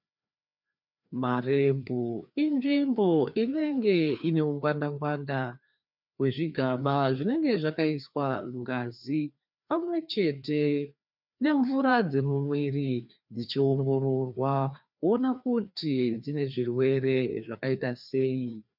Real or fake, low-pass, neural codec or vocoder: fake; 5.4 kHz; codec, 16 kHz, 2 kbps, FreqCodec, larger model